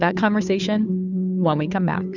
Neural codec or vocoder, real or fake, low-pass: codec, 16 kHz, 2 kbps, FunCodec, trained on Chinese and English, 25 frames a second; fake; 7.2 kHz